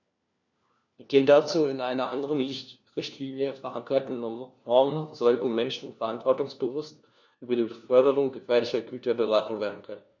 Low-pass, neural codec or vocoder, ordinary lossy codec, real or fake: 7.2 kHz; codec, 16 kHz, 1 kbps, FunCodec, trained on LibriTTS, 50 frames a second; none; fake